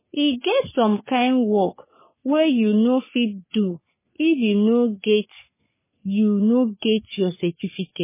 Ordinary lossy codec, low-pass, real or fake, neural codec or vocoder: MP3, 16 kbps; 3.6 kHz; fake; codec, 44.1 kHz, 3.4 kbps, Pupu-Codec